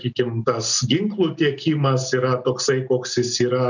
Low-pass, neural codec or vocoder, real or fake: 7.2 kHz; none; real